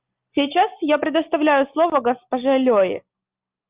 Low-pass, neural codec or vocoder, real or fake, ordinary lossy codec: 3.6 kHz; none; real; Opus, 24 kbps